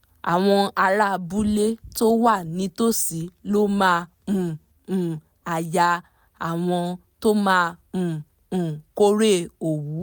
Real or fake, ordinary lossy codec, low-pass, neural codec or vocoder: real; none; none; none